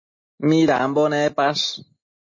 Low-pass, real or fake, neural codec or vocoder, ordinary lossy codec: 7.2 kHz; real; none; MP3, 32 kbps